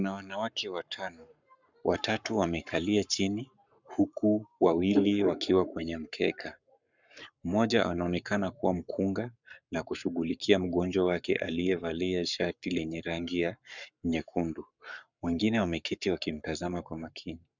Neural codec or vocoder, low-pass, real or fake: codec, 44.1 kHz, 7.8 kbps, Pupu-Codec; 7.2 kHz; fake